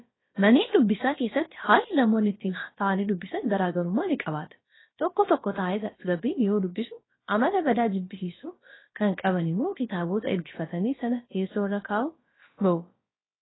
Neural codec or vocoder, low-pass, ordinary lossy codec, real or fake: codec, 16 kHz, about 1 kbps, DyCAST, with the encoder's durations; 7.2 kHz; AAC, 16 kbps; fake